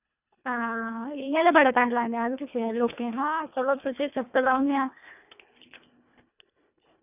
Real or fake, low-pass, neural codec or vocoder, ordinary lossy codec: fake; 3.6 kHz; codec, 24 kHz, 1.5 kbps, HILCodec; none